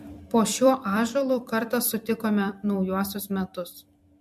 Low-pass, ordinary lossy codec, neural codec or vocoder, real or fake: 14.4 kHz; MP3, 64 kbps; vocoder, 44.1 kHz, 128 mel bands every 256 samples, BigVGAN v2; fake